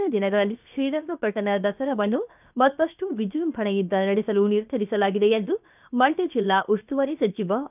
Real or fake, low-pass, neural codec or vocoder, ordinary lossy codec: fake; 3.6 kHz; codec, 16 kHz, 0.7 kbps, FocalCodec; none